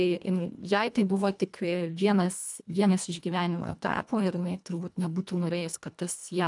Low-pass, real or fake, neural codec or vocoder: 10.8 kHz; fake; codec, 24 kHz, 1.5 kbps, HILCodec